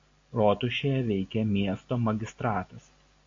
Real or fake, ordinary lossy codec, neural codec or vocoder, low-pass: real; AAC, 48 kbps; none; 7.2 kHz